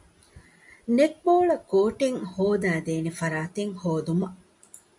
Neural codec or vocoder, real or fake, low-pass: none; real; 10.8 kHz